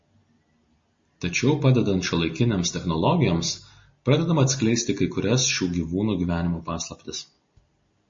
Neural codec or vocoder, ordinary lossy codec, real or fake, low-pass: none; MP3, 32 kbps; real; 7.2 kHz